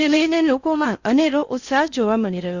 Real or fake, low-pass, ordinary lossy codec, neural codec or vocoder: fake; 7.2 kHz; Opus, 64 kbps; codec, 16 kHz in and 24 kHz out, 0.6 kbps, FocalCodec, streaming, 2048 codes